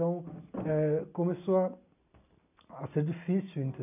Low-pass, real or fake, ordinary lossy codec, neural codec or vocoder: 3.6 kHz; real; none; none